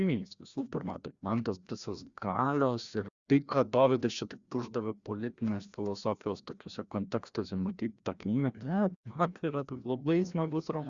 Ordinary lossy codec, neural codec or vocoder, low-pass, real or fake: Opus, 64 kbps; codec, 16 kHz, 1 kbps, FreqCodec, larger model; 7.2 kHz; fake